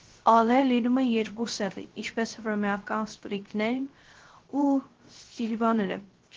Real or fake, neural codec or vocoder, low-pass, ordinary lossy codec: fake; codec, 16 kHz, 0.3 kbps, FocalCodec; 7.2 kHz; Opus, 16 kbps